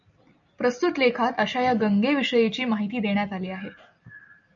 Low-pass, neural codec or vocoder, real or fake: 7.2 kHz; none; real